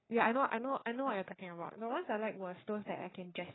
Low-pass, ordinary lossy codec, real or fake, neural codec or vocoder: 7.2 kHz; AAC, 16 kbps; fake; codec, 44.1 kHz, 3.4 kbps, Pupu-Codec